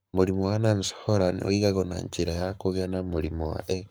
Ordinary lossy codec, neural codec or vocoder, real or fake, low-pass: none; codec, 44.1 kHz, 7.8 kbps, Pupu-Codec; fake; none